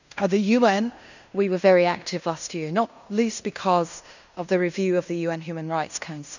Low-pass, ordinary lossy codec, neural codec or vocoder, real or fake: 7.2 kHz; none; codec, 16 kHz in and 24 kHz out, 0.9 kbps, LongCat-Audio-Codec, fine tuned four codebook decoder; fake